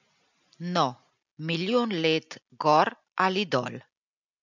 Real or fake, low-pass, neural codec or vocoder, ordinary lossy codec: real; 7.2 kHz; none; none